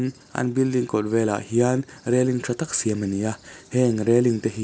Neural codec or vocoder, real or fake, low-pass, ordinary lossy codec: none; real; none; none